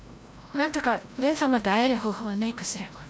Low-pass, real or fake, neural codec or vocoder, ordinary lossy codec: none; fake; codec, 16 kHz, 0.5 kbps, FreqCodec, larger model; none